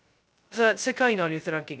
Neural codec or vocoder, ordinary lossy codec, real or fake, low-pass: codec, 16 kHz, 0.2 kbps, FocalCodec; none; fake; none